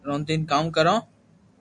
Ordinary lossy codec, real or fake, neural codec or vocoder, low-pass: AAC, 64 kbps; real; none; 10.8 kHz